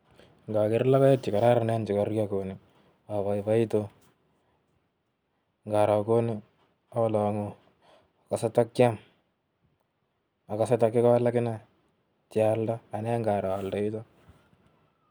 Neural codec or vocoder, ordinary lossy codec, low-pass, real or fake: none; none; none; real